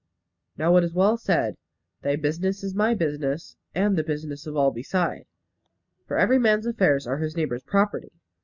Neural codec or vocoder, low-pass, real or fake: none; 7.2 kHz; real